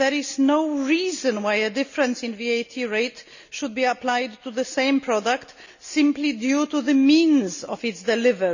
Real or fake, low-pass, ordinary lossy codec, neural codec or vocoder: real; 7.2 kHz; none; none